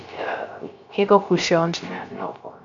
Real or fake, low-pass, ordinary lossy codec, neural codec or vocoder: fake; 7.2 kHz; MP3, 64 kbps; codec, 16 kHz, 0.3 kbps, FocalCodec